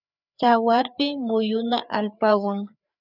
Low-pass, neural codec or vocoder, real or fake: 5.4 kHz; codec, 16 kHz, 4 kbps, FreqCodec, larger model; fake